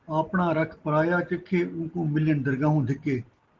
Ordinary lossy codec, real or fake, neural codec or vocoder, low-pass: Opus, 16 kbps; real; none; 7.2 kHz